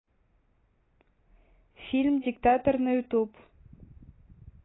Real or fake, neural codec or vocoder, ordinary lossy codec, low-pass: real; none; AAC, 16 kbps; 7.2 kHz